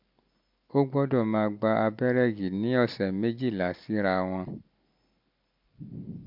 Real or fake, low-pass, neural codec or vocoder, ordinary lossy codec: real; 5.4 kHz; none; MP3, 48 kbps